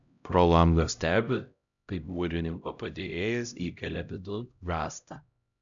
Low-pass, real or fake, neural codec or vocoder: 7.2 kHz; fake; codec, 16 kHz, 0.5 kbps, X-Codec, HuBERT features, trained on LibriSpeech